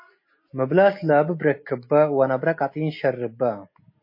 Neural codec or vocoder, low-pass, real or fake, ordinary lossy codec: none; 5.4 kHz; real; MP3, 24 kbps